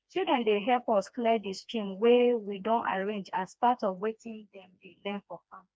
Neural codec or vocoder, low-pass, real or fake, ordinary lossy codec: codec, 16 kHz, 2 kbps, FreqCodec, smaller model; none; fake; none